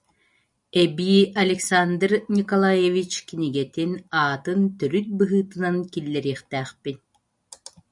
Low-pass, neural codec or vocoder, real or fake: 10.8 kHz; none; real